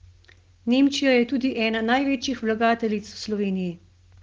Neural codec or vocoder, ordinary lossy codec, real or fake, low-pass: none; Opus, 16 kbps; real; 7.2 kHz